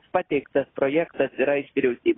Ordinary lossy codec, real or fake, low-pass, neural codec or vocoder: AAC, 16 kbps; real; 7.2 kHz; none